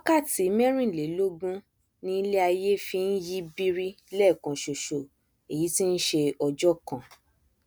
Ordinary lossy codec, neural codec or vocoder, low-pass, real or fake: none; none; none; real